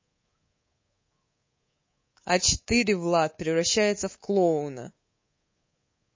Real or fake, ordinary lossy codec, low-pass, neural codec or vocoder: fake; MP3, 32 kbps; 7.2 kHz; codec, 24 kHz, 3.1 kbps, DualCodec